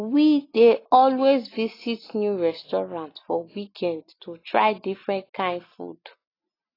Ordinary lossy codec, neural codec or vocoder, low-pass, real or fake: AAC, 24 kbps; none; 5.4 kHz; real